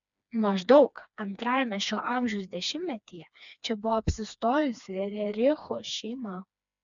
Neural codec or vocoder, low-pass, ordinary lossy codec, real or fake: codec, 16 kHz, 2 kbps, FreqCodec, smaller model; 7.2 kHz; MP3, 96 kbps; fake